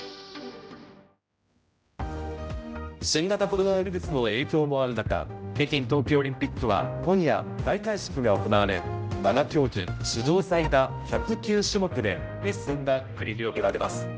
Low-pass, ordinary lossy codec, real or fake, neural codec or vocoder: none; none; fake; codec, 16 kHz, 0.5 kbps, X-Codec, HuBERT features, trained on general audio